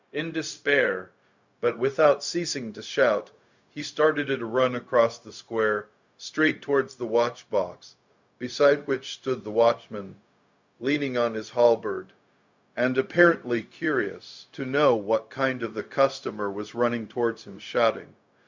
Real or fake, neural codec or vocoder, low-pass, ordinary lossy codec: fake; codec, 16 kHz, 0.4 kbps, LongCat-Audio-Codec; 7.2 kHz; Opus, 64 kbps